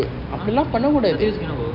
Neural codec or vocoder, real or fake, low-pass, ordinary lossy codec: none; real; 5.4 kHz; Opus, 64 kbps